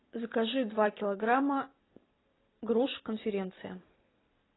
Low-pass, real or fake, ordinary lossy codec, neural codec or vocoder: 7.2 kHz; fake; AAC, 16 kbps; vocoder, 44.1 kHz, 128 mel bands every 256 samples, BigVGAN v2